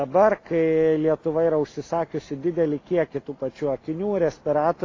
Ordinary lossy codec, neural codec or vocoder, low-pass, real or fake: AAC, 32 kbps; none; 7.2 kHz; real